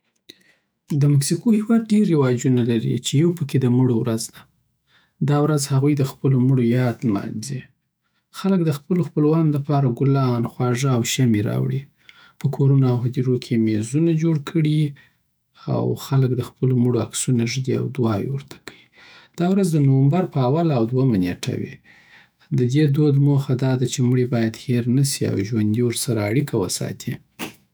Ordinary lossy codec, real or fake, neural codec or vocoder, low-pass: none; fake; autoencoder, 48 kHz, 128 numbers a frame, DAC-VAE, trained on Japanese speech; none